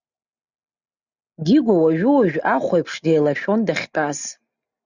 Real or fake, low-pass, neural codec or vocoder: real; 7.2 kHz; none